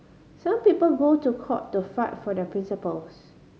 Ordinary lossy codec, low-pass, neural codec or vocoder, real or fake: none; none; none; real